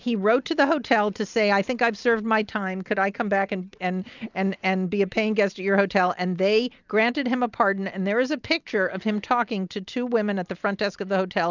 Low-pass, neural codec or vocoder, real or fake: 7.2 kHz; none; real